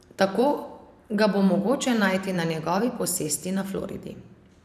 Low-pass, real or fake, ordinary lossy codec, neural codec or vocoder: 14.4 kHz; fake; none; vocoder, 44.1 kHz, 128 mel bands every 512 samples, BigVGAN v2